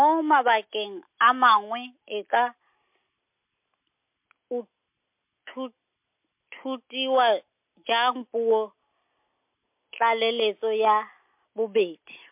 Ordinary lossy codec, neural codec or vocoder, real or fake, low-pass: MP3, 32 kbps; none; real; 3.6 kHz